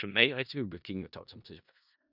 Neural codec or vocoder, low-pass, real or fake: codec, 16 kHz in and 24 kHz out, 0.4 kbps, LongCat-Audio-Codec, four codebook decoder; 5.4 kHz; fake